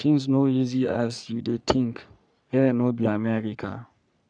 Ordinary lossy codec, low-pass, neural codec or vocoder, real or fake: none; 9.9 kHz; codec, 44.1 kHz, 3.4 kbps, Pupu-Codec; fake